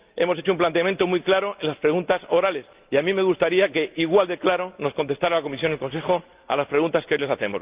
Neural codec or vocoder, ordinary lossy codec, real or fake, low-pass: none; Opus, 24 kbps; real; 3.6 kHz